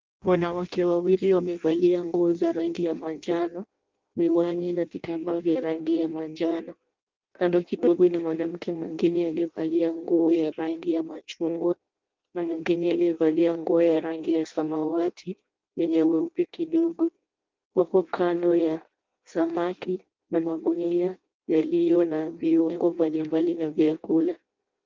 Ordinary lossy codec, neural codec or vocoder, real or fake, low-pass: Opus, 32 kbps; codec, 16 kHz in and 24 kHz out, 0.6 kbps, FireRedTTS-2 codec; fake; 7.2 kHz